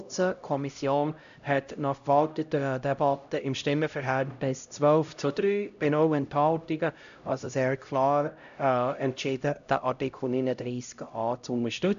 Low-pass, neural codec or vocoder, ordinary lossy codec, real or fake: 7.2 kHz; codec, 16 kHz, 0.5 kbps, X-Codec, HuBERT features, trained on LibriSpeech; AAC, 96 kbps; fake